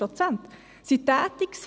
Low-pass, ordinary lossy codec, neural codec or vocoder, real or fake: none; none; none; real